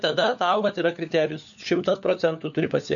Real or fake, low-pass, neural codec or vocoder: fake; 7.2 kHz; codec, 16 kHz, 4 kbps, FunCodec, trained on LibriTTS, 50 frames a second